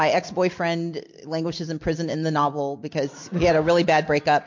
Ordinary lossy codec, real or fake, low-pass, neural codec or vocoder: MP3, 48 kbps; real; 7.2 kHz; none